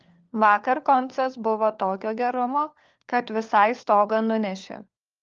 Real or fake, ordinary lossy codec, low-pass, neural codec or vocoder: fake; Opus, 32 kbps; 7.2 kHz; codec, 16 kHz, 4 kbps, FunCodec, trained on LibriTTS, 50 frames a second